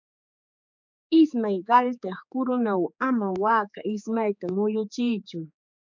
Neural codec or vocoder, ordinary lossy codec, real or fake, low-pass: codec, 16 kHz, 4 kbps, X-Codec, HuBERT features, trained on general audio; MP3, 64 kbps; fake; 7.2 kHz